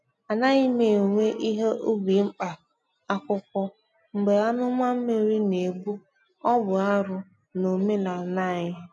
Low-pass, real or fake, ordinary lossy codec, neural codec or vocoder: none; real; none; none